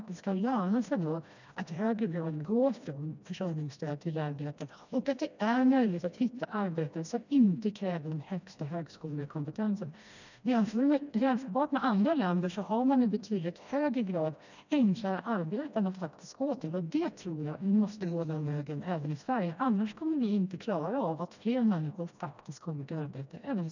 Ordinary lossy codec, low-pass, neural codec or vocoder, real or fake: none; 7.2 kHz; codec, 16 kHz, 1 kbps, FreqCodec, smaller model; fake